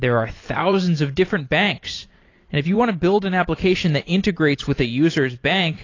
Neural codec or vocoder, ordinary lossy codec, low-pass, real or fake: vocoder, 44.1 kHz, 128 mel bands every 512 samples, BigVGAN v2; AAC, 32 kbps; 7.2 kHz; fake